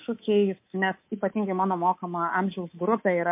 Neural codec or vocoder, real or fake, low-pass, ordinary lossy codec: codec, 24 kHz, 3.1 kbps, DualCodec; fake; 3.6 kHz; MP3, 24 kbps